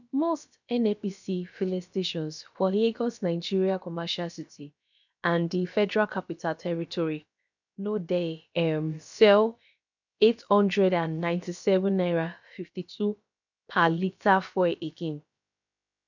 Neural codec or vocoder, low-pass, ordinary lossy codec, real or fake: codec, 16 kHz, about 1 kbps, DyCAST, with the encoder's durations; 7.2 kHz; none; fake